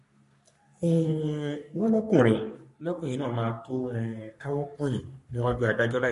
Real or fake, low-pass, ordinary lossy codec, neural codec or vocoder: fake; 14.4 kHz; MP3, 48 kbps; codec, 44.1 kHz, 3.4 kbps, Pupu-Codec